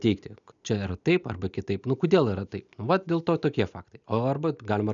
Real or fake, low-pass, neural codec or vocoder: real; 7.2 kHz; none